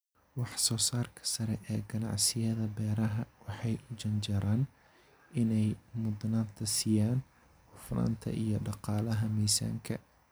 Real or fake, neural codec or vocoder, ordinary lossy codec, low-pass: real; none; none; none